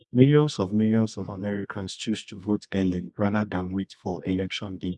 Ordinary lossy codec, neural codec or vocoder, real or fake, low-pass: none; codec, 24 kHz, 0.9 kbps, WavTokenizer, medium music audio release; fake; none